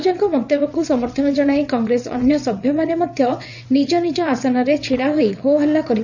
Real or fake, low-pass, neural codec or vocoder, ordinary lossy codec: fake; 7.2 kHz; vocoder, 22.05 kHz, 80 mel bands, WaveNeXt; none